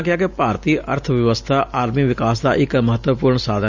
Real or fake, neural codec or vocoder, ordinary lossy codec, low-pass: real; none; Opus, 64 kbps; 7.2 kHz